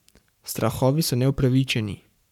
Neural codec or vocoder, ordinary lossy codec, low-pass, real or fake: codec, 44.1 kHz, 7.8 kbps, Pupu-Codec; none; 19.8 kHz; fake